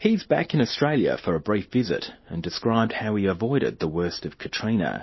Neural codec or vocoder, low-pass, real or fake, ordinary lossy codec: none; 7.2 kHz; real; MP3, 24 kbps